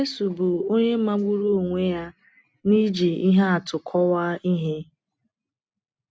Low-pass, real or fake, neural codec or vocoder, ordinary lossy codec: none; real; none; none